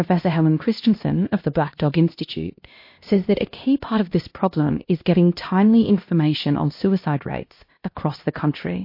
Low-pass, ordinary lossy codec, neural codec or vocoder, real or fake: 5.4 kHz; MP3, 32 kbps; codec, 16 kHz, 1 kbps, X-Codec, HuBERT features, trained on LibriSpeech; fake